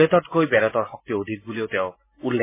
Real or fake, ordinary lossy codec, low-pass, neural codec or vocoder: real; MP3, 16 kbps; 3.6 kHz; none